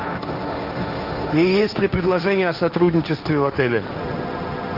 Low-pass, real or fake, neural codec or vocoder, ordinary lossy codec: 5.4 kHz; fake; codec, 16 kHz, 1.1 kbps, Voila-Tokenizer; Opus, 32 kbps